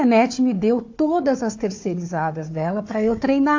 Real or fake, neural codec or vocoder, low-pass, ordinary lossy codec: fake; codec, 16 kHz, 4 kbps, FunCodec, trained on Chinese and English, 50 frames a second; 7.2 kHz; AAC, 48 kbps